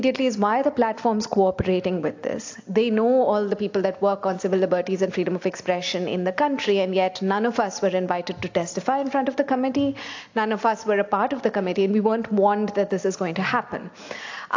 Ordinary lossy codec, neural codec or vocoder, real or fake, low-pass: AAC, 48 kbps; none; real; 7.2 kHz